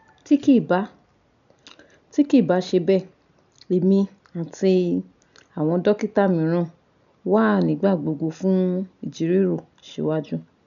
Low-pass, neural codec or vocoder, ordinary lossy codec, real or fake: 7.2 kHz; none; none; real